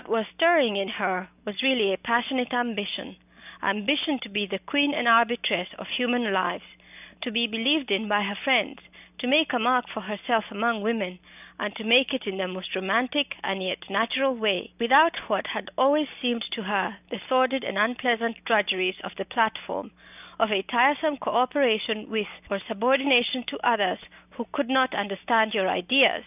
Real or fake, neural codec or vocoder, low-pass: real; none; 3.6 kHz